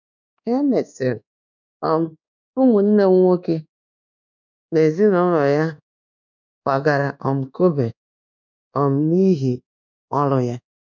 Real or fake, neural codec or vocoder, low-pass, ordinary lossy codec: fake; codec, 24 kHz, 1.2 kbps, DualCodec; 7.2 kHz; none